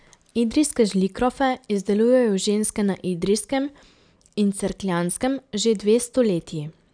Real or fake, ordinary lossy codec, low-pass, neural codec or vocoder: real; none; 9.9 kHz; none